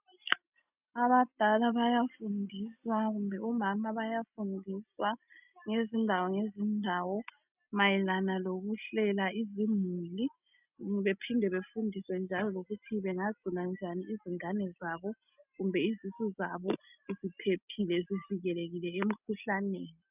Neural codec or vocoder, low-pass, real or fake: none; 3.6 kHz; real